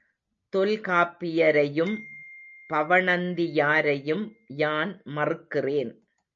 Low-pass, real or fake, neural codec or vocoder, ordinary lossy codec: 7.2 kHz; real; none; MP3, 64 kbps